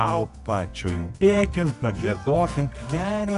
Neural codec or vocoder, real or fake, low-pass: codec, 24 kHz, 0.9 kbps, WavTokenizer, medium music audio release; fake; 10.8 kHz